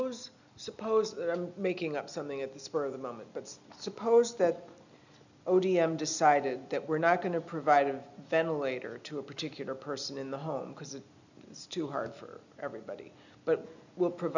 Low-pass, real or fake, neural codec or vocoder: 7.2 kHz; real; none